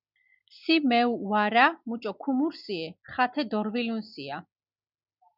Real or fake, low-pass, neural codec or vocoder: real; 5.4 kHz; none